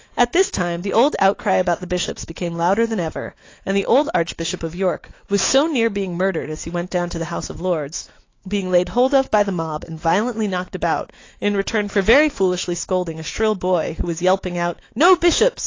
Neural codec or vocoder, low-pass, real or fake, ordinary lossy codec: autoencoder, 48 kHz, 128 numbers a frame, DAC-VAE, trained on Japanese speech; 7.2 kHz; fake; AAC, 32 kbps